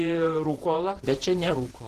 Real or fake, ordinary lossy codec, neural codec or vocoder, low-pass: fake; Opus, 16 kbps; vocoder, 48 kHz, 128 mel bands, Vocos; 14.4 kHz